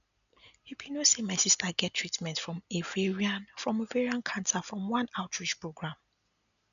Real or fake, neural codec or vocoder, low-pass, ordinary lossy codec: real; none; 7.2 kHz; none